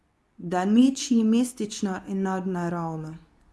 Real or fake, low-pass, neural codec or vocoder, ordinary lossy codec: fake; none; codec, 24 kHz, 0.9 kbps, WavTokenizer, medium speech release version 2; none